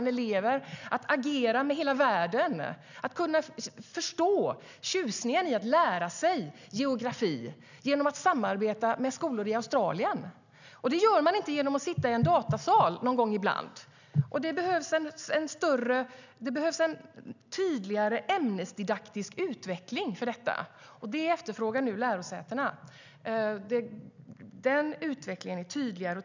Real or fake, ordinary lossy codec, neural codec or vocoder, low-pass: real; none; none; 7.2 kHz